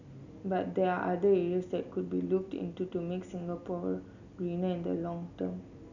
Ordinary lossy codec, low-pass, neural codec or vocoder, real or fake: none; 7.2 kHz; none; real